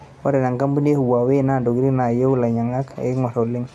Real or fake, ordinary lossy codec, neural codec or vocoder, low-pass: real; none; none; none